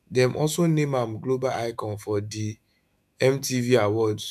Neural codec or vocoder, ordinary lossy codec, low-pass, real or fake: autoencoder, 48 kHz, 128 numbers a frame, DAC-VAE, trained on Japanese speech; none; 14.4 kHz; fake